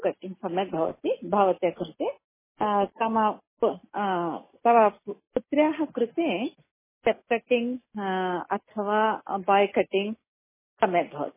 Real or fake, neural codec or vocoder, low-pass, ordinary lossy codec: real; none; 3.6 kHz; MP3, 16 kbps